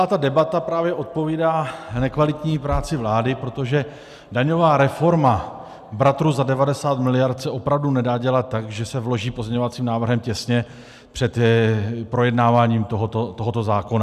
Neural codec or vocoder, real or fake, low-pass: none; real; 14.4 kHz